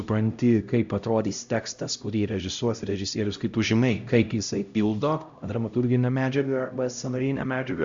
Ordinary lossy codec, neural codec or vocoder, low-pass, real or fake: Opus, 64 kbps; codec, 16 kHz, 0.5 kbps, X-Codec, HuBERT features, trained on LibriSpeech; 7.2 kHz; fake